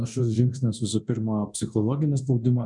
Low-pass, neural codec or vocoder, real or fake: 10.8 kHz; codec, 24 kHz, 0.9 kbps, DualCodec; fake